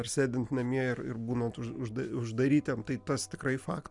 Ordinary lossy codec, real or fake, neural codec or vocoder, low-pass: MP3, 96 kbps; real; none; 10.8 kHz